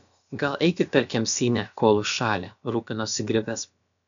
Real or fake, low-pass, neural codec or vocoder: fake; 7.2 kHz; codec, 16 kHz, about 1 kbps, DyCAST, with the encoder's durations